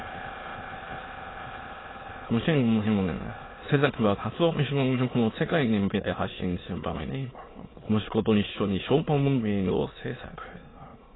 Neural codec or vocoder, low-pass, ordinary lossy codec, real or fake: autoencoder, 22.05 kHz, a latent of 192 numbers a frame, VITS, trained on many speakers; 7.2 kHz; AAC, 16 kbps; fake